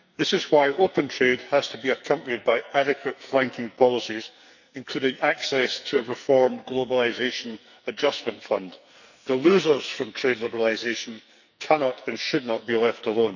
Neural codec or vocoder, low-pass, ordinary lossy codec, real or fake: codec, 44.1 kHz, 2.6 kbps, SNAC; 7.2 kHz; none; fake